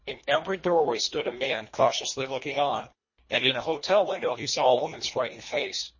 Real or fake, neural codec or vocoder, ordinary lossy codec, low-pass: fake; codec, 24 kHz, 1.5 kbps, HILCodec; MP3, 32 kbps; 7.2 kHz